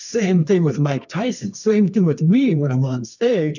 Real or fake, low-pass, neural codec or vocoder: fake; 7.2 kHz; codec, 24 kHz, 0.9 kbps, WavTokenizer, medium music audio release